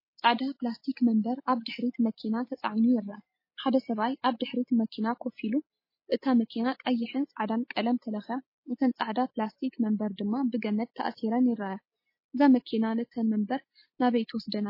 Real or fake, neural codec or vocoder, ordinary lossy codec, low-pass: real; none; MP3, 24 kbps; 5.4 kHz